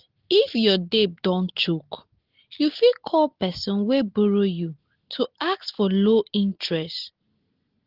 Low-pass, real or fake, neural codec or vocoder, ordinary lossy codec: 5.4 kHz; real; none; Opus, 16 kbps